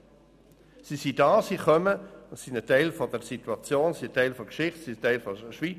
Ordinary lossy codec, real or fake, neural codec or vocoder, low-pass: none; real; none; 14.4 kHz